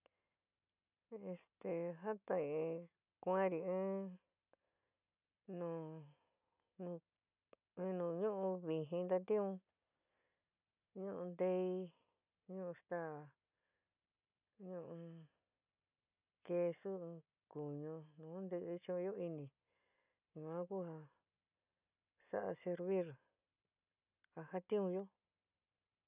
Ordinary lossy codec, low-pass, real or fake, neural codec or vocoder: none; 3.6 kHz; real; none